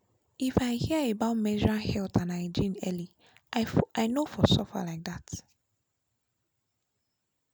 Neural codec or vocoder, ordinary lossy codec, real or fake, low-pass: none; none; real; none